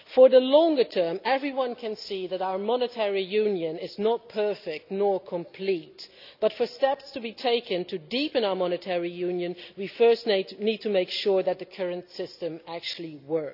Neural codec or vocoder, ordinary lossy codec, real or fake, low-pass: none; none; real; 5.4 kHz